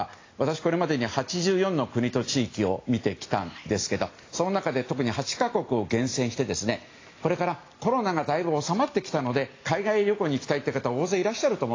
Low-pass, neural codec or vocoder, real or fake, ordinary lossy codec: 7.2 kHz; none; real; AAC, 32 kbps